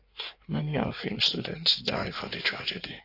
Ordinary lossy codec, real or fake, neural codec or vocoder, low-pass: AAC, 32 kbps; fake; codec, 16 kHz in and 24 kHz out, 1.1 kbps, FireRedTTS-2 codec; 5.4 kHz